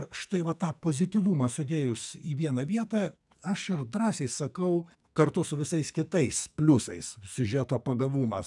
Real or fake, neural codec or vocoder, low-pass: fake; codec, 44.1 kHz, 2.6 kbps, SNAC; 10.8 kHz